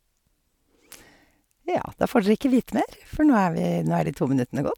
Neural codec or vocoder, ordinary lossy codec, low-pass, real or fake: none; MP3, 96 kbps; 19.8 kHz; real